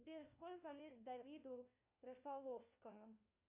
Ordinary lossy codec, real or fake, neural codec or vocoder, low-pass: Opus, 64 kbps; fake; codec, 16 kHz, 1 kbps, FunCodec, trained on LibriTTS, 50 frames a second; 3.6 kHz